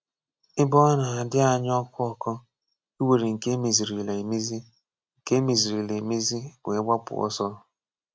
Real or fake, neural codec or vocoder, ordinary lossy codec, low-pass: real; none; none; none